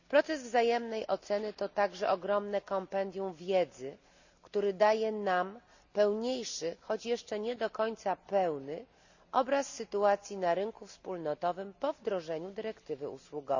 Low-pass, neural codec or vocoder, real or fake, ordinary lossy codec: 7.2 kHz; none; real; none